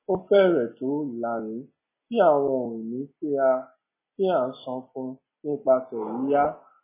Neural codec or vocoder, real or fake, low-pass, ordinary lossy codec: none; real; 3.6 kHz; MP3, 16 kbps